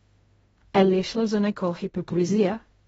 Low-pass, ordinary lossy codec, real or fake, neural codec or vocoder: 10.8 kHz; AAC, 24 kbps; fake; codec, 16 kHz in and 24 kHz out, 0.4 kbps, LongCat-Audio-Codec, fine tuned four codebook decoder